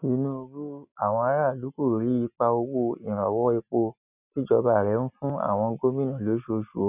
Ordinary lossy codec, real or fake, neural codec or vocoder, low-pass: none; real; none; 3.6 kHz